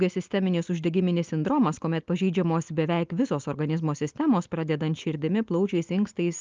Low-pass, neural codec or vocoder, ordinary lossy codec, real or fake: 7.2 kHz; none; Opus, 24 kbps; real